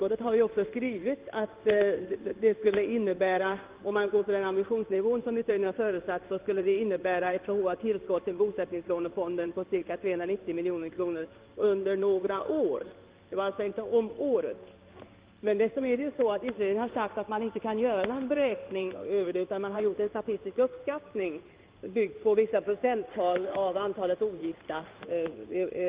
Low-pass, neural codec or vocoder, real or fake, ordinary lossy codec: 3.6 kHz; codec, 16 kHz in and 24 kHz out, 1 kbps, XY-Tokenizer; fake; Opus, 32 kbps